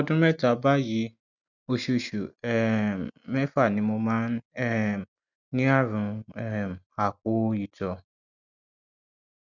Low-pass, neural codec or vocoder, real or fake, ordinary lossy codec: 7.2 kHz; none; real; none